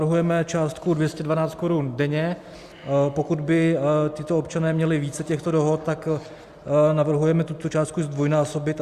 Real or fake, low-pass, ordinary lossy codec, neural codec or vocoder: real; 14.4 kHz; Opus, 64 kbps; none